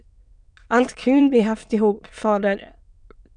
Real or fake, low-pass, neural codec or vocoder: fake; 9.9 kHz; autoencoder, 22.05 kHz, a latent of 192 numbers a frame, VITS, trained on many speakers